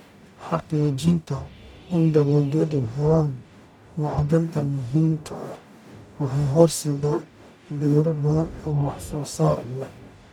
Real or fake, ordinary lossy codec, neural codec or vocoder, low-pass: fake; none; codec, 44.1 kHz, 0.9 kbps, DAC; 19.8 kHz